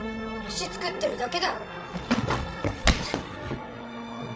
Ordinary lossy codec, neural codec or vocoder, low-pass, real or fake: none; codec, 16 kHz, 16 kbps, FreqCodec, larger model; none; fake